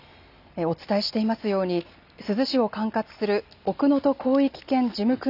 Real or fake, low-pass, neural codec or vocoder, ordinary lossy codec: real; 5.4 kHz; none; none